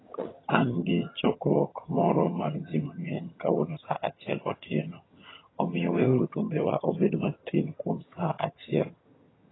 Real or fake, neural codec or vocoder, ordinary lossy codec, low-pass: fake; vocoder, 22.05 kHz, 80 mel bands, HiFi-GAN; AAC, 16 kbps; 7.2 kHz